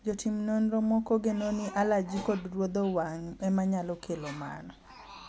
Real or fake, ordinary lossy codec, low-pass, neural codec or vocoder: real; none; none; none